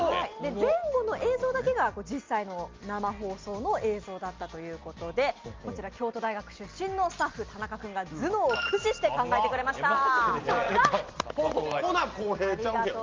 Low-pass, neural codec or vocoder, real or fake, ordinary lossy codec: 7.2 kHz; none; real; Opus, 24 kbps